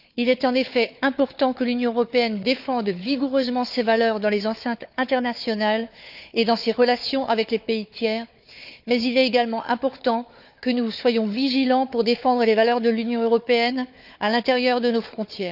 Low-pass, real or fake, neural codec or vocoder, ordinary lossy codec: 5.4 kHz; fake; codec, 16 kHz, 4 kbps, FunCodec, trained on Chinese and English, 50 frames a second; none